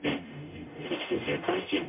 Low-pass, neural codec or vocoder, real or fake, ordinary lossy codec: 3.6 kHz; codec, 44.1 kHz, 0.9 kbps, DAC; fake; MP3, 32 kbps